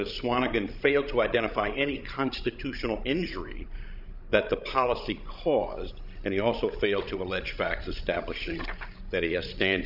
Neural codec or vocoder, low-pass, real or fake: codec, 16 kHz, 16 kbps, FreqCodec, larger model; 5.4 kHz; fake